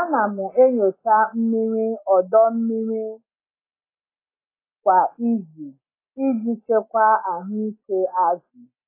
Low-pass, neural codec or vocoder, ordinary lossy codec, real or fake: 3.6 kHz; none; AAC, 24 kbps; real